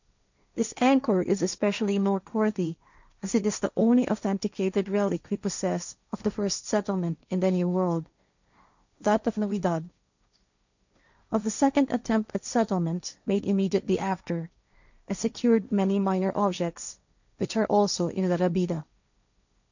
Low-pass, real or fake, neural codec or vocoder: 7.2 kHz; fake; codec, 16 kHz, 1.1 kbps, Voila-Tokenizer